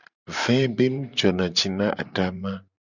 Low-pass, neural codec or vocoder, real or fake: 7.2 kHz; vocoder, 44.1 kHz, 128 mel bands every 512 samples, BigVGAN v2; fake